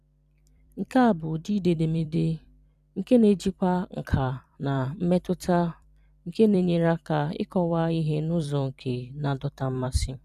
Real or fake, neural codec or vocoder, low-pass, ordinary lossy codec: fake; vocoder, 44.1 kHz, 128 mel bands every 256 samples, BigVGAN v2; 14.4 kHz; none